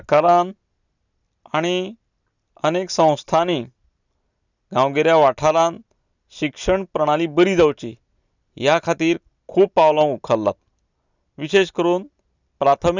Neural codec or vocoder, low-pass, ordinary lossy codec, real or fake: none; 7.2 kHz; none; real